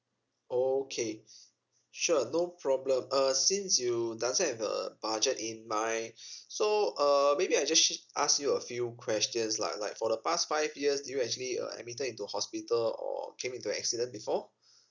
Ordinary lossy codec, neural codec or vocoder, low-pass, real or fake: none; none; 7.2 kHz; real